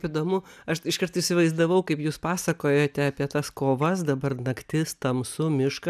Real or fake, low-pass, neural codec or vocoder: real; 14.4 kHz; none